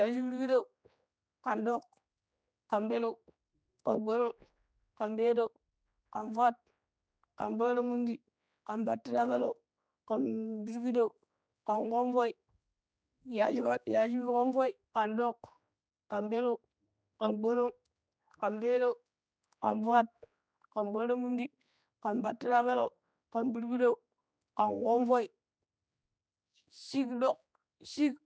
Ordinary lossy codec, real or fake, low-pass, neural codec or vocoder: none; fake; none; codec, 16 kHz, 2 kbps, X-Codec, HuBERT features, trained on general audio